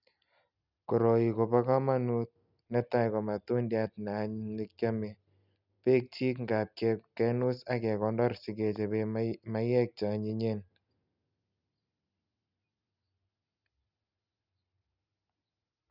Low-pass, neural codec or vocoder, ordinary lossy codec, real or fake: 5.4 kHz; none; none; real